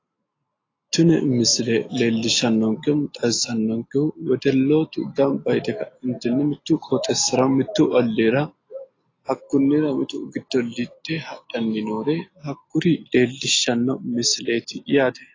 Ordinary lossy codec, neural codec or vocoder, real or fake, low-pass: AAC, 32 kbps; none; real; 7.2 kHz